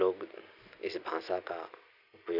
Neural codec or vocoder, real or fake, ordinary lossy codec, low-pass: none; real; none; 5.4 kHz